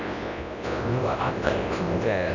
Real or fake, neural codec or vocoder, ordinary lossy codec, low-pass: fake; codec, 24 kHz, 0.9 kbps, WavTokenizer, large speech release; none; 7.2 kHz